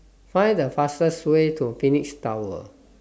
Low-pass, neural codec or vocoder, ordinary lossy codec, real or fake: none; none; none; real